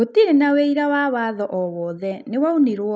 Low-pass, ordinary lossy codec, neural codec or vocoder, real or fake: none; none; none; real